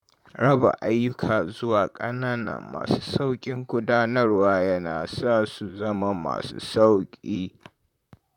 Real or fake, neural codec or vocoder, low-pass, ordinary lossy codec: fake; vocoder, 44.1 kHz, 128 mel bands, Pupu-Vocoder; 19.8 kHz; none